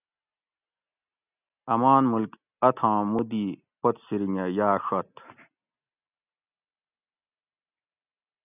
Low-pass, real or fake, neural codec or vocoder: 3.6 kHz; real; none